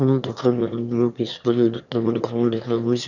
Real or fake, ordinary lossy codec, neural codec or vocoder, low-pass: fake; none; autoencoder, 22.05 kHz, a latent of 192 numbers a frame, VITS, trained on one speaker; 7.2 kHz